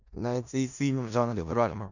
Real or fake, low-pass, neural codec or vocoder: fake; 7.2 kHz; codec, 16 kHz in and 24 kHz out, 0.4 kbps, LongCat-Audio-Codec, four codebook decoder